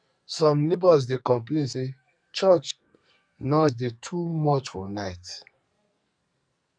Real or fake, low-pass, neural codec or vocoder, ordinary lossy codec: fake; 9.9 kHz; codec, 44.1 kHz, 2.6 kbps, SNAC; none